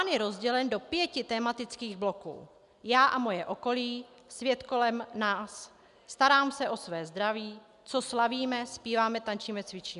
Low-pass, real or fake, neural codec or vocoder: 10.8 kHz; real; none